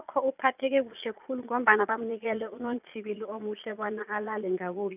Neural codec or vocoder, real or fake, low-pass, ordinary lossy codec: vocoder, 22.05 kHz, 80 mel bands, Vocos; fake; 3.6 kHz; none